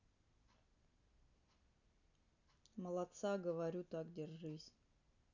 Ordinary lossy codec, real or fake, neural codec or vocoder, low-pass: none; real; none; 7.2 kHz